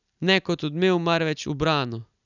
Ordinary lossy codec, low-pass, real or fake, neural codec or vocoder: none; 7.2 kHz; real; none